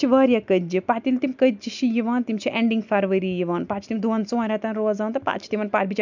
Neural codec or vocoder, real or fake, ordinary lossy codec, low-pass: none; real; none; 7.2 kHz